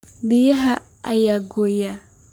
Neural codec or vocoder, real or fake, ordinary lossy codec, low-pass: codec, 44.1 kHz, 3.4 kbps, Pupu-Codec; fake; none; none